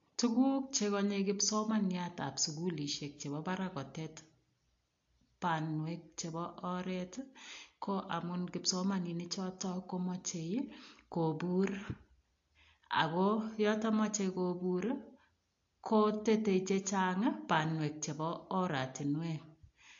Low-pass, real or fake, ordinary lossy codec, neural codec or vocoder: 7.2 kHz; real; AAC, 48 kbps; none